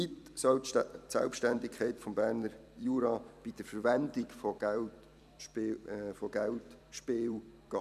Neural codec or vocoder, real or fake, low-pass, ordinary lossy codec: vocoder, 44.1 kHz, 128 mel bands every 256 samples, BigVGAN v2; fake; 14.4 kHz; none